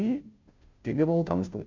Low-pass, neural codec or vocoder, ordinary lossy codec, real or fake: 7.2 kHz; codec, 16 kHz, 0.5 kbps, FunCodec, trained on Chinese and English, 25 frames a second; MP3, 48 kbps; fake